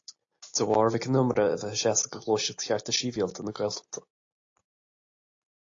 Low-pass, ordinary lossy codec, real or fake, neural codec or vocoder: 7.2 kHz; AAC, 48 kbps; real; none